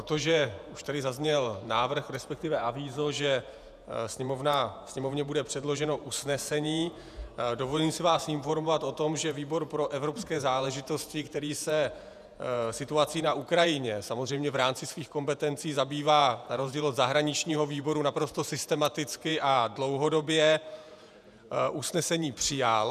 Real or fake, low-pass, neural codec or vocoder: fake; 14.4 kHz; vocoder, 48 kHz, 128 mel bands, Vocos